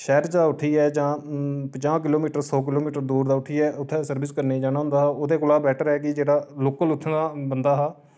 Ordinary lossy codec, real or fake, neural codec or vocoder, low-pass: none; real; none; none